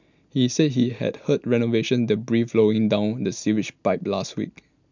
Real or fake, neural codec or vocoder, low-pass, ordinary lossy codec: real; none; 7.2 kHz; none